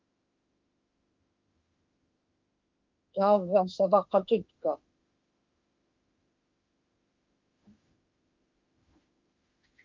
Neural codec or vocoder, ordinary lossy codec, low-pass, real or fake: autoencoder, 48 kHz, 32 numbers a frame, DAC-VAE, trained on Japanese speech; Opus, 24 kbps; 7.2 kHz; fake